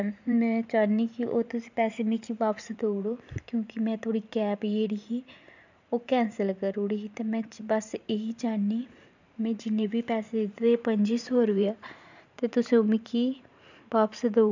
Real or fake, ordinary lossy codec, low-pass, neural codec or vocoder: fake; none; 7.2 kHz; vocoder, 44.1 kHz, 128 mel bands every 512 samples, BigVGAN v2